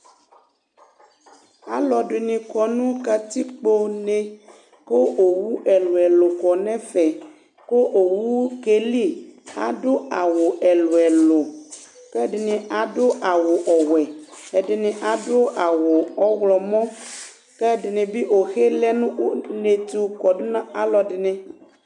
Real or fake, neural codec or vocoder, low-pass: real; none; 10.8 kHz